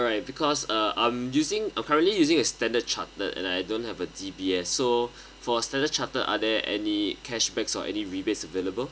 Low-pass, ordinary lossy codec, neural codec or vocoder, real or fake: none; none; none; real